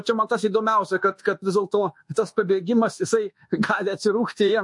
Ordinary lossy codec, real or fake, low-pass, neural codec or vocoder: MP3, 48 kbps; fake; 10.8 kHz; codec, 24 kHz, 1.2 kbps, DualCodec